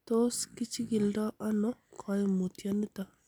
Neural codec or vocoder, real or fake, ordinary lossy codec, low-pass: none; real; none; none